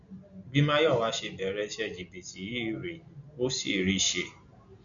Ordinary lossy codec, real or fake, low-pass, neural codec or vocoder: none; real; 7.2 kHz; none